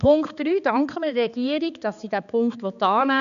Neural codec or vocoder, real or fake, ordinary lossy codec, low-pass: codec, 16 kHz, 4 kbps, X-Codec, HuBERT features, trained on balanced general audio; fake; none; 7.2 kHz